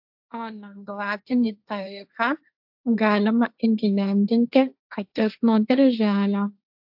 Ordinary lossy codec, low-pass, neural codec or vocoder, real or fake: AAC, 48 kbps; 5.4 kHz; codec, 16 kHz, 1.1 kbps, Voila-Tokenizer; fake